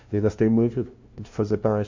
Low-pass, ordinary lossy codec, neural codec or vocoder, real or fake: 7.2 kHz; MP3, 48 kbps; codec, 16 kHz, 0.5 kbps, FunCodec, trained on LibriTTS, 25 frames a second; fake